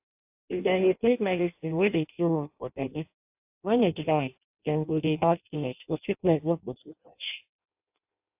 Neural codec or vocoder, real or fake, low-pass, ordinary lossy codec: codec, 16 kHz in and 24 kHz out, 0.6 kbps, FireRedTTS-2 codec; fake; 3.6 kHz; AAC, 32 kbps